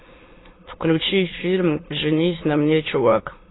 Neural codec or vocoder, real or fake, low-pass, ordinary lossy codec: autoencoder, 22.05 kHz, a latent of 192 numbers a frame, VITS, trained on many speakers; fake; 7.2 kHz; AAC, 16 kbps